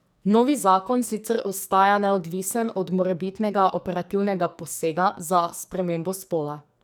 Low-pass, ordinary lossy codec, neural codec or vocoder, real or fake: none; none; codec, 44.1 kHz, 2.6 kbps, SNAC; fake